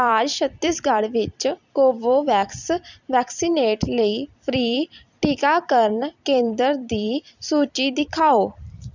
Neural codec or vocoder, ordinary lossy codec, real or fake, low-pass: vocoder, 44.1 kHz, 128 mel bands every 256 samples, BigVGAN v2; none; fake; 7.2 kHz